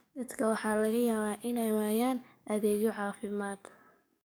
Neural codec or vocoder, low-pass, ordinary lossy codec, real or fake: codec, 44.1 kHz, 7.8 kbps, DAC; none; none; fake